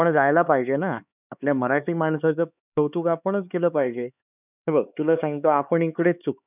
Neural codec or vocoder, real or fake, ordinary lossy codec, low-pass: codec, 16 kHz, 4 kbps, X-Codec, HuBERT features, trained on LibriSpeech; fake; none; 3.6 kHz